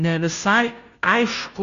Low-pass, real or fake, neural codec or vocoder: 7.2 kHz; fake; codec, 16 kHz, 0.5 kbps, FunCodec, trained on Chinese and English, 25 frames a second